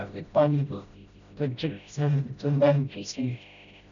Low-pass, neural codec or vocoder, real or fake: 7.2 kHz; codec, 16 kHz, 0.5 kbps, FreqCodec, smaller model; fake